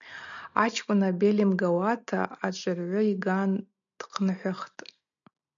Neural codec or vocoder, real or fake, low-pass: none; real; 7.2 kHz